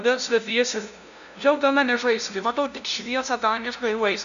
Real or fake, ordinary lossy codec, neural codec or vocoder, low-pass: fake; AAC, 96 kbps; codec, 16 kHz, 0.5 kbps, FunCodec, trained on LibriTTS, 25 frames a second; 7.2 kHz